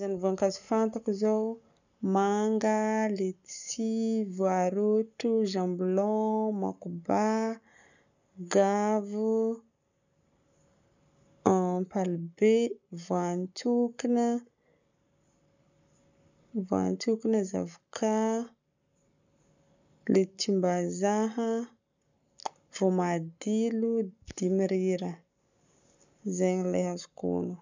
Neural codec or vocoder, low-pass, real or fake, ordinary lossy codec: codec, 44.1 kHz, 7.8 kbps, Pupu-Codec; 7.2 kHz; fake; none